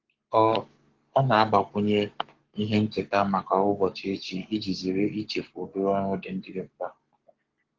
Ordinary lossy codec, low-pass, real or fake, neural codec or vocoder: Opus, 16 kbps; 7.2 kHz; fake; codec, 44.1 kHz, 7.8 kbps, Pupu-Codec